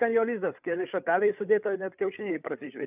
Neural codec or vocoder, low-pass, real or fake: codec, 16 kHz, 8 kbps, FreqCodec, larger model; 3.6 kHz; fake